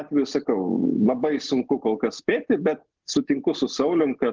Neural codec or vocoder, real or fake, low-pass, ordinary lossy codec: none; real; 7.2 kHz; Opus, 16 kbps